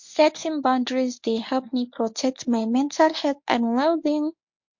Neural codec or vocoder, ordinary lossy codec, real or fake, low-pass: codec, 24 kHz, 0.9 kbps, WavTokenizer, medium speech release version 1; MP3, 48 kbps; fake; 7.2 kHz